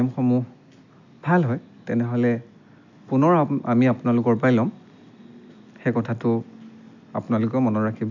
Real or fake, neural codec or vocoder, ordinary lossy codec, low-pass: real; none; none; 7.2 kHz